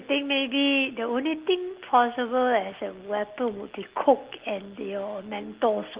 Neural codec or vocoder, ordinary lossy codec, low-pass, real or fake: none; Opus, 16 kbps; 3.6 kHz; real